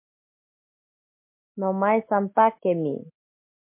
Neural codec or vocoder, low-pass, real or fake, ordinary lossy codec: none; 3.6 kHz; real; MP3, 24 kbps